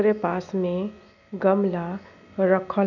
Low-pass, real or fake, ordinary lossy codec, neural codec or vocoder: 7.2 kHz; real; none; none